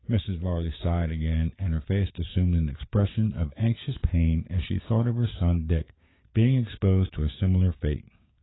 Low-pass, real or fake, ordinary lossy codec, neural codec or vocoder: 7.2 kHz; fake; AAC, 16 kbps; codec, 16 kHz, 6 kbps, DAC